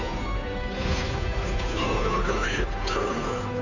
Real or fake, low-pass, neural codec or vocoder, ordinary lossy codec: fake; 7.2 kHz; codec, 16 kHz, 2 kbps, FunCodec, trained on Chinese and English, 25 frames a second; none